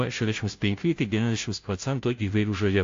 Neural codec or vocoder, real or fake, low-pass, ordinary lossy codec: codec, 16 kHz, 0.5 kbps, FunCodec, trained on Chinese and English, 25 frames a second; fake; 7.2 kHz; AAC, 48 kbps